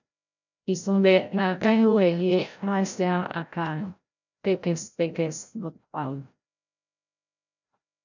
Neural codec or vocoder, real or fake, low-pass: codec, 16 kHz, 0.5 kbps, FreqCodec, larger model; fake; 7.2 kHz